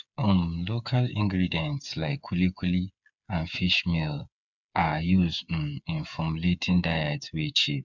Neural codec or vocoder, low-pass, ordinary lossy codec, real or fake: codec, 16 kHz, 8 kbps, FreqCodec, smaller model; 7.2 kHz; none; fake